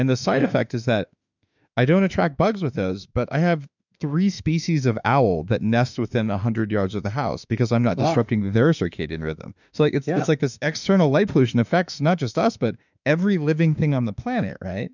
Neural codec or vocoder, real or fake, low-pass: autoencoder, 48 kHz, 32 numbers a frame, DAC-VAE, trained on Japanese speech; fake; 7.2 kHz